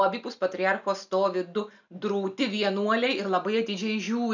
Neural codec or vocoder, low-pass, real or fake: none; 7.2 kHz; real